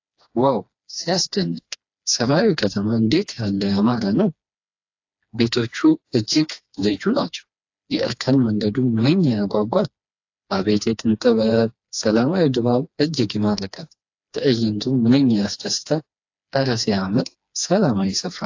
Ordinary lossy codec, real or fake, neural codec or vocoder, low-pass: AAC, 48 kbps; fake; codec, 16 kHz, 2 kbps, FreqCodec, smaller model; 7.2 kHz